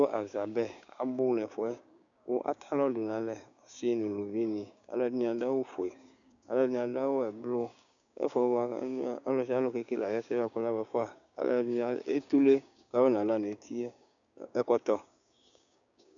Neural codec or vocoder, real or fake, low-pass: codec, 16 kHz, 6 kbps, DAC; fake; 7.2 kHz